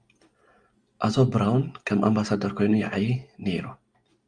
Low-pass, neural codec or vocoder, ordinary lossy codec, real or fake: 9.9 kHz; none; Opus, 32 kbps; real